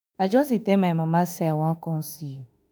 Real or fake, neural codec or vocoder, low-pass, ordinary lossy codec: fake; autoencoder, 48 kHz, 32 numbers a frame, DAC-VAE, trained on Japanese speech; none; none